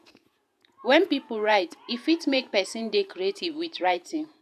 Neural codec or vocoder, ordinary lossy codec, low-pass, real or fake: vocoder, 48 kHz, 128 mel bands, Vocos; none; 14.4 kHz; fake